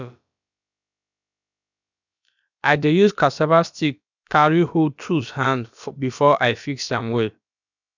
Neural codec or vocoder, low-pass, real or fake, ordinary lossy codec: codec, 16 kHz, about 1 kbps, DyCAST, with the encoder's durations; 7.2 kHz; fake; none